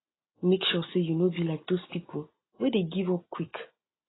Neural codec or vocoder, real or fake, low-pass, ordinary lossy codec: none; real; 7.2 kHz; AAC, 16 kbps